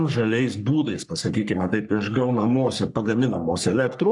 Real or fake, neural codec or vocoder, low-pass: fake; codec, 44.1 kHz, 3.4 kbps, Pupu-Codec; 10.8 kHz